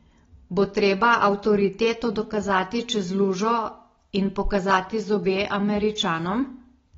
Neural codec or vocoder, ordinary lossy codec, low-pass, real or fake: none; AAC, 24 kbps; 7.2 kHz; real